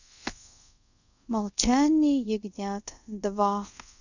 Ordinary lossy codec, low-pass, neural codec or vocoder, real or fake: none; 7.2 kHz; codec, 24 kHz, 0.5 kbps, DualCodec; fake